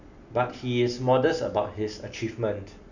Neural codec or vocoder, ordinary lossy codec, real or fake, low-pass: none; none; real; 7.2 kHz